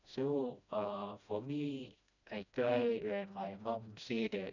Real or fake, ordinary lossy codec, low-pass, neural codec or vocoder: fake; none; 7.2 kHz; codec, 16 kHz, 1 kbps, FreqCodec, smaller model